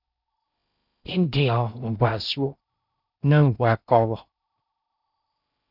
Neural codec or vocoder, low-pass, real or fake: codec, 16 kHz in and 24 kHz out, 0.6 kbps, FocalCodec, streaming, 4096 codes; 5.4 kHz; fake